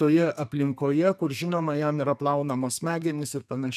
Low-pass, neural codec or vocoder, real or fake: 14.4 kHz; codec, 32 kHz, 1.9 kbps, SNAC; fake